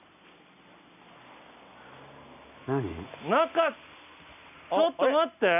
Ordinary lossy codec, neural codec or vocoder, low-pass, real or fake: none; none; 3.6 kHz; real